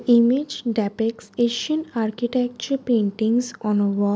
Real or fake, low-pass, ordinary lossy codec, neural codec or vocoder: real; none; none; none